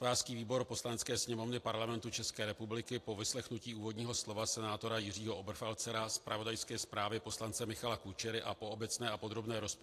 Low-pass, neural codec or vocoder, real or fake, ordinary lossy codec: 14.4 kHz; none; real; AAC, 64 kbps